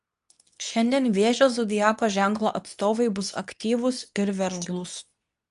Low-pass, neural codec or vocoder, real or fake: 10.8 kHz; codec, 24 kHz, 0.9 kbps, WavTokenizer, medium speech release version 2; fake